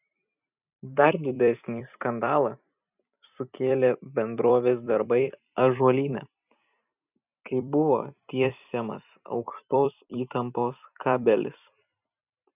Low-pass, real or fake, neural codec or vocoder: 3.6 kHz; fake; vocoder, 44.1 kHz, 128 mel bands every 256 samples, BigVGAN v2